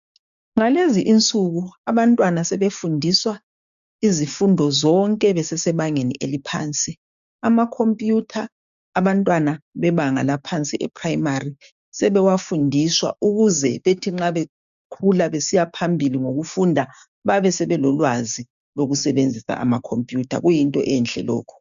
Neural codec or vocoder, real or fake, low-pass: codec, 16 kHz, 6 kbps, DAC; fake; 7.2 kHz